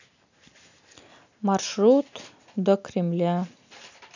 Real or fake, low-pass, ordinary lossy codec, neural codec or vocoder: real; 7.2 kHz; none; none